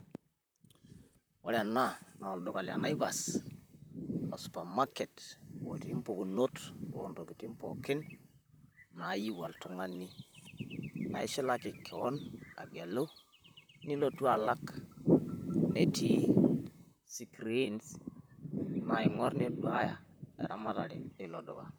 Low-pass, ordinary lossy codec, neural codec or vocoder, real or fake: none; none; vocoder, 44.1 kHz, 128 mel bands, Pupu-Vocoder; fake